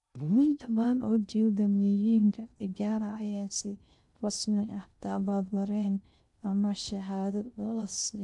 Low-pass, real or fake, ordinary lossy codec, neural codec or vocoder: 10.8 kHz; fake; none; codec, 16 kHz in and 24 kHz out, 0.6 kbps, FocalCodec, streaming, 2048 codes